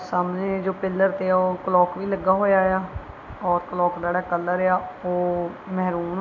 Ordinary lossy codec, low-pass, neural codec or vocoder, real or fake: none; 7.2 kHz; none; real